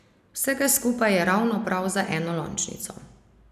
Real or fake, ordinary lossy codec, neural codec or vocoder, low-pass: real; none; none; 14.4 kHz